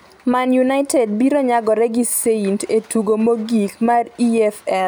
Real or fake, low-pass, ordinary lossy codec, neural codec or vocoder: real; none; none; none